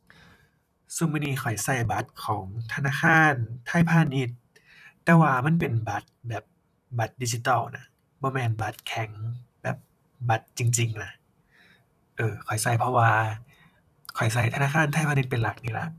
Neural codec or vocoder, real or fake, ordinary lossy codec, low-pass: vocoder, 44.1 kHz, 128 mel bands, Pupu-Vocoder; fake; none; 14.4 kHz